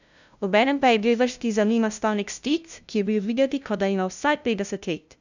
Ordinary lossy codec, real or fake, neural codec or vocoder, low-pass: none; fake; codec, 16 kHz, 0.5 kbps, FunCodec, trained on LibriTTS, 25 frames a second; 7.2 kHz